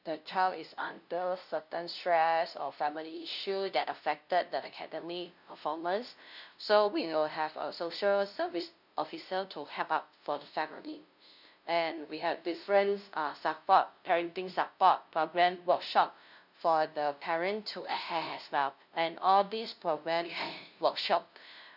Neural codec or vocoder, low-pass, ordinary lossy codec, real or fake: codec, 16 kHz, 0.5 kbps, FunCodec, trained on LibriTTS, 25 frames a second; 5.4 kHz; none; fake